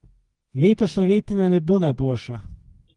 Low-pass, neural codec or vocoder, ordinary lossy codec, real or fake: 10.8 kHz; codec, 24 kHz, 0.9 kbps, WavTokenizer, medium music audio release; Opus, 24 kbps; fake